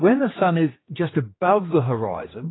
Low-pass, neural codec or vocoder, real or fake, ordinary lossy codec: 7.2 kHz; codec, 16 kHz, 2 kbps, X-Codec, HuBERT features, trained on general audio; fake; AAC, 16 kbps